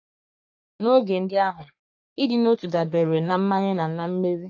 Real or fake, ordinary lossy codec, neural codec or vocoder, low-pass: fake; AAC, 48 kbps; codec, 44.1 kHz, 3.4 kbps, Pupu-Codec; 7.2 kHz